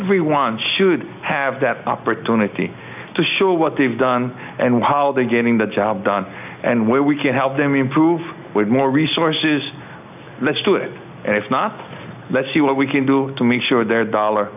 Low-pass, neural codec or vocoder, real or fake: 3.6 kHz; none; real